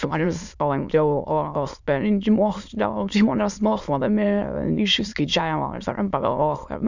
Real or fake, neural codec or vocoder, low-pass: fake; autoencoder, 22.05 kHz, a latent of 192 numbers a frame, VITS, trained on many speakers; 7.2 kHz